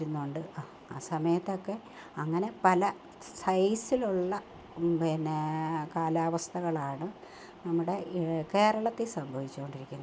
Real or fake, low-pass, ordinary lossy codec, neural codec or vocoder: real; none; none; none